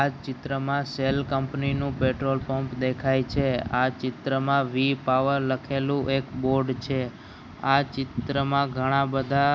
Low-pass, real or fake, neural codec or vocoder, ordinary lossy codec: none; real; none; none